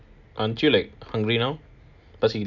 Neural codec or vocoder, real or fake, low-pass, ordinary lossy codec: none; real; 7.2 kHz; none